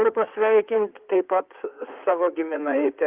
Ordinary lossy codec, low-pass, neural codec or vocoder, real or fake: Opus, 32 kbps; 3.6 kHz; codec, 16 kHz in and 24 kHz out, 2.2 kbps, FireRedTTS-2 codec; fake